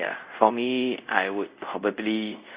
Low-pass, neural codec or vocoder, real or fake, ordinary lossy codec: 3.6 kHz; codec, 24 kHz, 0.5 kbps, DualCodec; fake; Opus, 64 kbps